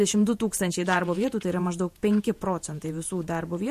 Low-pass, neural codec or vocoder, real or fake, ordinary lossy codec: 14.4 kHz; vocoder, 48 kHz, 128 mel bands, Vocos; fake; MP3, 64 kbps